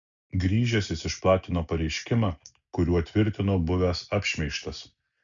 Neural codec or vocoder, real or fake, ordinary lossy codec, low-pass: none; real; MP3, 96 kbps; 7.2 kHz